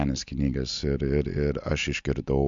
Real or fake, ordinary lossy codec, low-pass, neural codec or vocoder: real; MP3, 64 kbps; 7.2 kHz; none